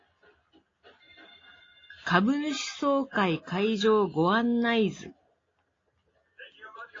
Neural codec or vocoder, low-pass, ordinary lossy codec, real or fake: none; 7.2 kHz; AAC, 32 kbps; real